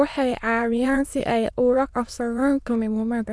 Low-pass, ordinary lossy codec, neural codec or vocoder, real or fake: none; none; autoencoder, 22.05 kHz, a latent of 192 numbers a frame, VITS, trained on many speakers; fake